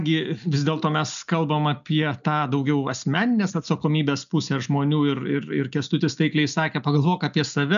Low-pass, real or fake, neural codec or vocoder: 7.2 kHz; real; none